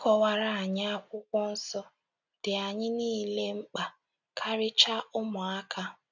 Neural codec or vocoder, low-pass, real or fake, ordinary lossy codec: none; 7.2 kHz; real; none